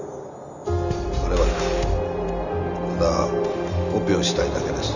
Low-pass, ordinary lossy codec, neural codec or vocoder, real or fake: 7.2 kHz; none; none; real